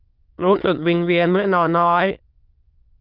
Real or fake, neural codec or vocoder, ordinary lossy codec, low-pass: fake; autoencoder, 22.05 kHz, a latent of 192 numbers a frame, VITS, trained on many speakers; Opus, 32 kbps; 5.4 kHz